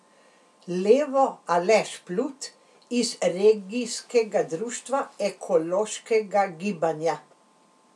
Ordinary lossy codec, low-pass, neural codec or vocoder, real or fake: none; none; none; real